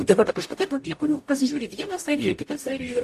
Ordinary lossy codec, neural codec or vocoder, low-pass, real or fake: AAC, 64 kbps; codec, 44.1 kHz, 0.9 kbps, DAC; 14.4 kHz; fake